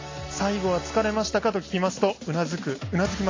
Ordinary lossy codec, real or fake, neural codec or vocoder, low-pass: AAC, 32 kbps; real; none; 7.2 kHz